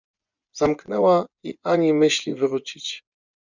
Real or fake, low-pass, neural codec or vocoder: fake; 7.2 kHz; vocoder, 24 kHz, 100 mel bands, Vocos